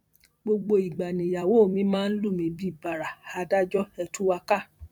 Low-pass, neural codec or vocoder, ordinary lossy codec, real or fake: 19.8 kHz; vocoder, 44.1 kHz, 128 mel bands every 256 samples, BigVGAN v2; none; fake